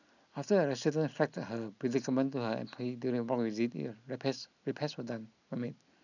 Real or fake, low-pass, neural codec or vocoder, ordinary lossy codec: real; 7.2 kHz; none; none